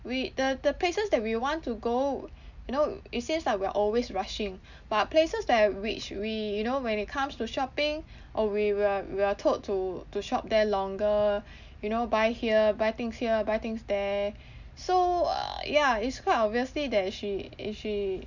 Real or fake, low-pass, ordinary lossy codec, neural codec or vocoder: real; 7.2 kHz; none; none